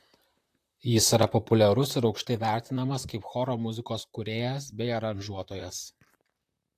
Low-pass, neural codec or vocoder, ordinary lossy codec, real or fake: 14.4 kHz; vocoder, 44.1 kHz, 128 mel bands, Pupu-Vocoder; AAC, 64 kbps; fake